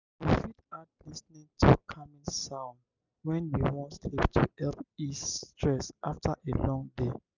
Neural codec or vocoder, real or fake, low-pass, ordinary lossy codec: none; real; 7.2 kHz; none